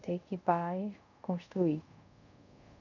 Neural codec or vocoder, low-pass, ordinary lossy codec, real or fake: codec, 24 kHz, 0.5 kbps, DualCodec; 7.2 kHz; none; fake